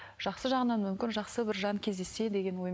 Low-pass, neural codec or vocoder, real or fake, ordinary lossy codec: none; none; real; none